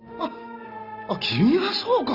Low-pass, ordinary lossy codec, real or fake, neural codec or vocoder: 5.4 kHz; Opus, 32 kbps; real; none